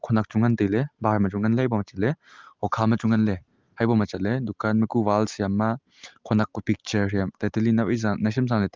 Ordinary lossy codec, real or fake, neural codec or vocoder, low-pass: Opus, 32 kbps; real; none; 7.2 kHz